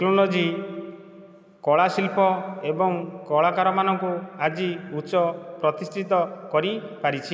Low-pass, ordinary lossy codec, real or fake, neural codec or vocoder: none; none; real; none